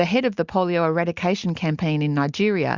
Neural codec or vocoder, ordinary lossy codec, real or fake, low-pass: none; Opus, 64 kbps; real; 7.2 kHz